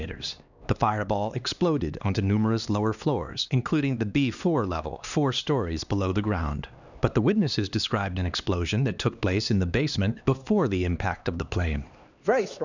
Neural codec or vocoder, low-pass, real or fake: codec, 16 kHz, 2 kbps, X-Codec, HuBERT features, trained on LibriSpeech; 7.2 kHz; fake